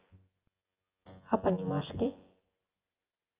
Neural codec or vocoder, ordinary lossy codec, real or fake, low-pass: vocoder, 24 kHz, 100 mel bands, Vocos; Opus, 24 kbps; fake; 3.6 kHz